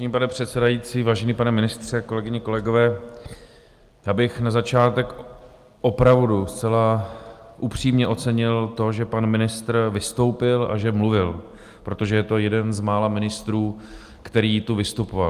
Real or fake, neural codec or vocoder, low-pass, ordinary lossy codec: real; none; 14.4 kHz; Opus, 32 kbps